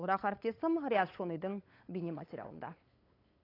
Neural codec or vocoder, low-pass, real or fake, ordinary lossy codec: codec, 16 kHz, 8 kbps, FunCodec, trained on LibriTTS, 25 frames a second; 5.4 kHz; fake; AAC, 24 kbps